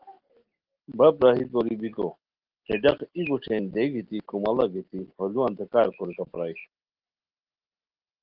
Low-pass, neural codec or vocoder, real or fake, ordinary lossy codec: 5.4 kHz; none; real; Opus, 16 kbps